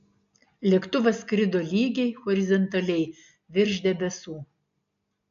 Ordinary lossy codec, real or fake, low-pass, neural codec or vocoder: MP3, 64 kbps; real; 7.2 kHz; none